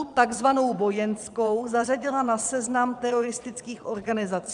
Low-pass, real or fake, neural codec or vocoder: 9.9 kHz; fake; vocoder, 22.05 kHz, 80 mel bands, Vocos